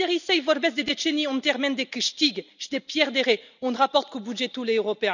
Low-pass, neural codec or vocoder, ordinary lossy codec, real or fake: 7.2 kHz; none; none; real